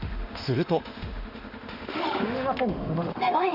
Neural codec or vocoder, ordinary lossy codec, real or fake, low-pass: autoencoder, 48 kHz, 32 numbers a frame, DAC-VAE, trained on Japanese speech; Opus, 64 kbps; fake; 5.4 kHz